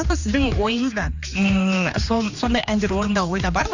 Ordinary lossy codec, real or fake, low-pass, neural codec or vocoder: Opus, 64 kbps; fake; 7.2 kHz; codec, 16 kHz, 2 kbps, X-Codec, HuBERT features, trained on general audio